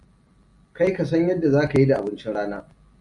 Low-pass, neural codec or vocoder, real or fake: 10.8 kHz; none; real